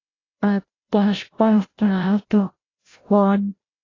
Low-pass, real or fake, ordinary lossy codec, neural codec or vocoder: 7.2 kHz; fake; AAC, 32 kbps; codec, 16 kHz, 0.5 kbps, FreqCodec, larger model